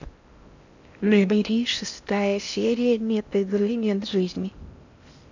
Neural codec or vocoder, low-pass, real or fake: codec, 16 kHz in and 24 kHz out, 0.6 kbps, FocalCodec, streaming, 4096 codes; 7.2 kHz; fake